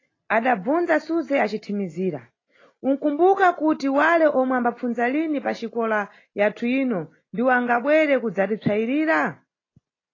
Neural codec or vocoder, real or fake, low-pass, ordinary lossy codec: none; real; 7.2 kHz; AAC, 32 kbps